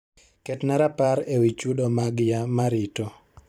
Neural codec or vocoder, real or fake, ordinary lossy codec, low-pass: vocoder, 44.1 kHz, 128 mel bands every 512 samples, BigVGAN v2; fake; none; 19.8 kHz